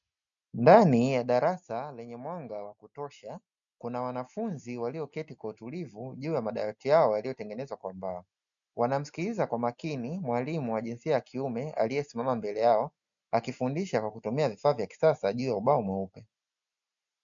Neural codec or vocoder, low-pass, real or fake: none; 7.2 kHz; real